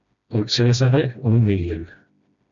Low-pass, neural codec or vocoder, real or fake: 7.2 kHz; codec, 16 kHz, 1 kbps, FreqCodec, smaller model; fake